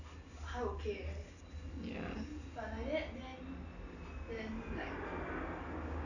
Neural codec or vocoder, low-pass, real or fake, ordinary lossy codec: none; 7.2 kHz; real; none